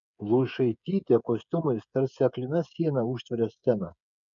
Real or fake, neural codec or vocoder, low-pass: fake; codec, 16 kHz, 8 kbps, FreqCodec, smaller model; 7.2 kHz